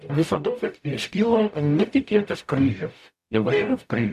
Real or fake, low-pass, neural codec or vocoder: fake; 14.4 kHz; codec, 44.1 kHz, 0.9 kbps, DAC